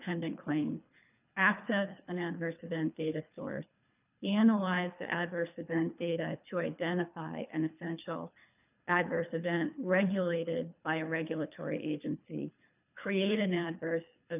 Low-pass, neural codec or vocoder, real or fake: 3.6 kHz; codec, 24 kHz, 3 kbps, HILCodec; fake